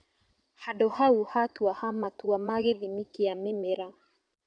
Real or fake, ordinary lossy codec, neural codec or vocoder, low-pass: fake; none; vocoder, 22.05 kHz, 80 mel bands, Vocos; 9.9 kHz